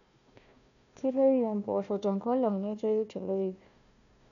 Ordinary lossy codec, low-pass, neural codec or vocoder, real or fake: none; 7.2 kHz; codec, 16 kHz, 1 kbps, FunCodec, trained on Chinese and English, 50 frames a second; fake